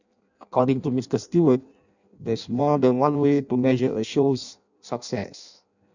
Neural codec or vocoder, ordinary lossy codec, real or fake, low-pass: codec, 16 kHz in and 24 kHz out, 0.6 kbps, FireRedTTS-2 codec; none; fake; 7.2 kHz